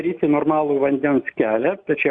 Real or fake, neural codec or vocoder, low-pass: real; none; 9.9 kHz